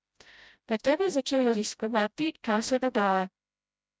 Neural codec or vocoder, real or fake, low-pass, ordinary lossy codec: codec, 16 kHz, 0.5 kbps, FreqCodec, smaller model; fake; none; none